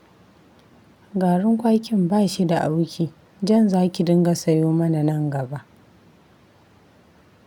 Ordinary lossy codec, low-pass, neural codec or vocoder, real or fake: none; 19.8 kHz; none; real